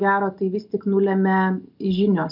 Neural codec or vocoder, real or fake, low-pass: none; real; 5.4 kHz